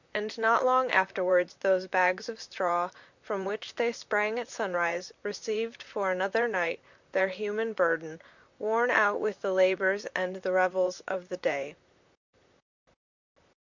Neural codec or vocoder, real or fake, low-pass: vocoder, 44.1 kHz, 128 mel bands, Pupu-Vocoder; fake; 7.2 kHz